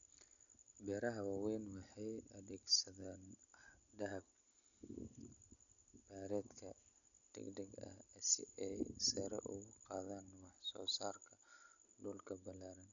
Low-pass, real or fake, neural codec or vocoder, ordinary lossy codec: 7.2 kHz; real; none; none